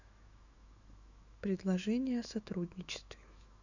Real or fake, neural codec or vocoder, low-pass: fake; autoencoder, 48 kHz, 128 numbers a frame, DAC-VAE, trained on Japanese speech; 7.2 kHz